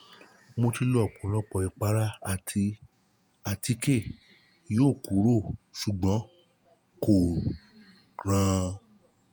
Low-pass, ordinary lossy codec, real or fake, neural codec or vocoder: none; none; fake; vocoder, 48 kHz, 128 mel bands, Vocos